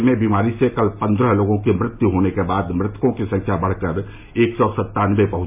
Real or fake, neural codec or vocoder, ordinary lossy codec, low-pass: real; none; MP3, 32 kbps; 3.6 kHz